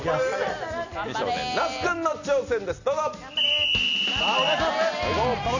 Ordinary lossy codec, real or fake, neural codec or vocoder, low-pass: none; real; none; 7.2 kHz